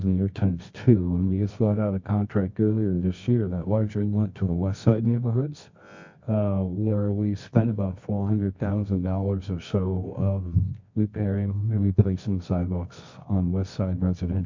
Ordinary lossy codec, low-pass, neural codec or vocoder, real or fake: MP3, 48 kbps; 7.2 kHz; codec, 24 kHz, 0.9 kbps, WavTokenizer, medium music audio release; fake